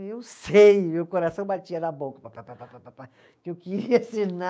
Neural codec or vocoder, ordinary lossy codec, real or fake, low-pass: codec, 16 kHz, 6 kbps, DAC; none; fake; none